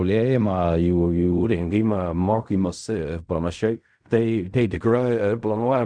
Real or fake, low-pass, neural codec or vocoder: fake; 9.9 kHz; codec, 16 kHz in and 24 kHz out, 0.4 kbps, LongCat-Audio-Codec, fine tuned four codebook decoder